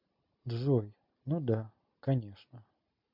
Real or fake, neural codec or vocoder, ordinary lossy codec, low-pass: real; none; MP3, 48 kbps; 5.4 kHz